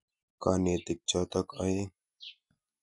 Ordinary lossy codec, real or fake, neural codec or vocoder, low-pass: none; real; none; 10.8 kHz